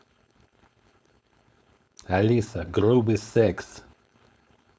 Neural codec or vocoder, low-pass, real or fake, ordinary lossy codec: codec, 16 kHz, 4.8 kbps, FACodec; none; fake; none